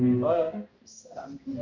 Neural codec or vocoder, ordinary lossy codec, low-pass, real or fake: codec, 16 kHz, 0.5 kbps, X-Codec, HuBERT features, trained on balanced general audio; Opus, 64 kbps; 7.2 kHz; fake